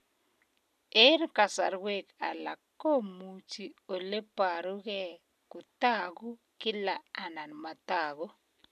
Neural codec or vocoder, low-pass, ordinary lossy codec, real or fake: none; 14.4 kHz; none; real